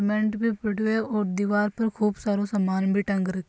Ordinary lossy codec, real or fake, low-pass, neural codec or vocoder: none; real; none; none